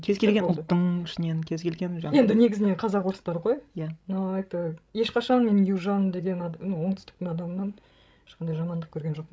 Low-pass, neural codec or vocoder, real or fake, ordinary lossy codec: none; codec, 16 kHz, 16 kbps, FreqCodec, larger model; fake; none